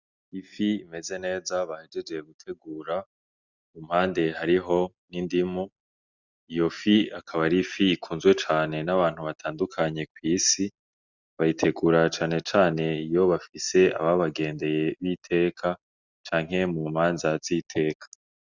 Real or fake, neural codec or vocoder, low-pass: real; none; 7.2 kHz